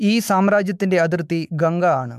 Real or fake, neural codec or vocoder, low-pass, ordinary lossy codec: fake; autoencoder, 48 kHz, 128 numbers a frame, DAC-VAE, trained on Japanese speech; 14.4 kHz; MP3, 96 kbps